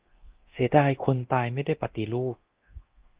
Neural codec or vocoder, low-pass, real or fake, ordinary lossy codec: codec, 24 kHz, 0.9 kbps, DualCodec; 3.6 kHz; fake; Opus, 16 kbps